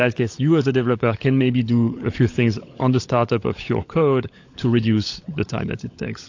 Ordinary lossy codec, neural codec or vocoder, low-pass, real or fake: AAC, 48 kbps; codec, 16 kHz, 16 kbps, FunCodec, trained on LibriTTS, 50 frames a second; 7.2 kHz; fake